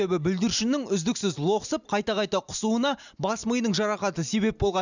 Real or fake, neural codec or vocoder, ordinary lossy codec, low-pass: real; none; none; 7.2 kHz